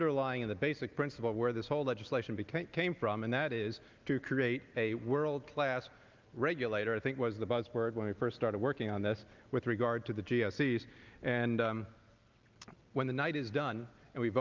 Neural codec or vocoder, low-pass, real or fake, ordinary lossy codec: none; 7.2 kHz; real; Opus, 24 kbps